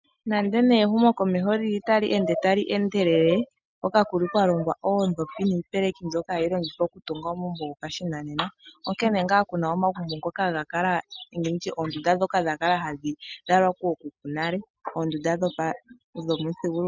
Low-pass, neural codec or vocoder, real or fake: 7.2 kHz; none; real